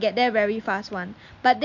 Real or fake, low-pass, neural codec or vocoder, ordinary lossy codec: real; 7.2 kHz; none; MP3, 48 kbps